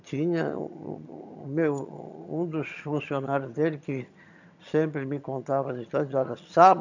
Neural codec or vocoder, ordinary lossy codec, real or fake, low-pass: vocoder, 22.05 kHz, 80 mel bands, HiFi-GAN; none; fake; 7.2 kHz